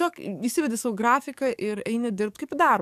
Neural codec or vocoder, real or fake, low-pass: codec, 44.1 kHz, 7.8 kbps, DAC; fake; 14.4 kHz